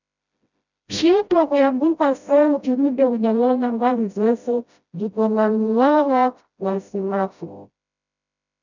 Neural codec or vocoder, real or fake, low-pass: codec, 16 kHz, 0.5 kbps, FreqCodec, smaller model; fake; 7.2 kHz